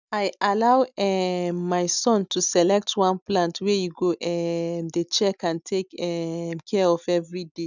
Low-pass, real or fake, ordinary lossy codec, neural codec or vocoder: 7.2 kHz; real; none; none